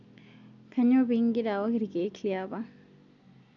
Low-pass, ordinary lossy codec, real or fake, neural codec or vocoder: 7.2 kHz; none; real; none